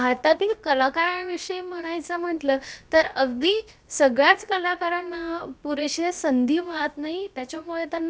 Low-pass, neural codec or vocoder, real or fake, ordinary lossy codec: none; codec, 16 kHz, about 1 kbps, DyCAST, with the encoder's durations; fake; none